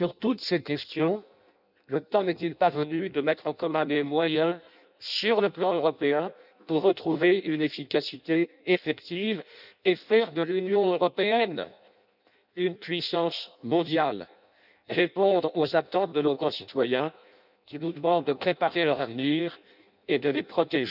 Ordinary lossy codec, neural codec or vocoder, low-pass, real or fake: none; codec, 16 kHz in and 24 kHz out, 0.6 kbps, FireRedTTS-2 codec; 5.4 kHz; fake